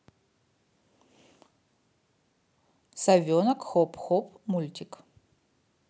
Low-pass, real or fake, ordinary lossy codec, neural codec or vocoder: none; real; none; none